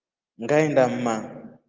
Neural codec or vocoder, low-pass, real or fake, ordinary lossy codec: none; 7.2 kHz; real; Opus, 32 kbps